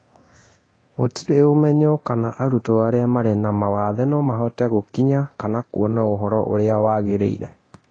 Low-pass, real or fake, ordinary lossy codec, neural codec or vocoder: 9.9 kHz; fake; AAC, 32 kbps; codec, 24 kHz, 0.9 kbps, DualCodec